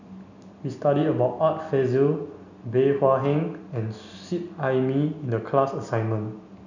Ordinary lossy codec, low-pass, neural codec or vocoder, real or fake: none; 7.2 kHz; none; real